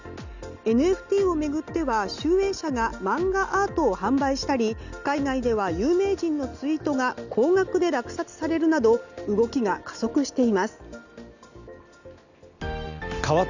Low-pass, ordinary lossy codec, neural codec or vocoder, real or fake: 7.2 kHz; none; none; real